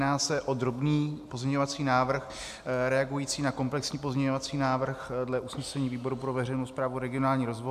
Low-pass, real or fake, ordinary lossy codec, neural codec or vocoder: 14.4 kHz; real; AAC, 96 kbps; none